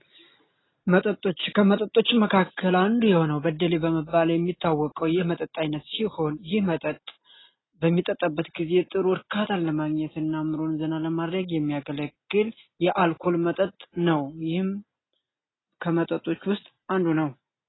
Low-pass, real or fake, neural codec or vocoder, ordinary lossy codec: 7.2 kHz; real; none; AAC, 16 kbps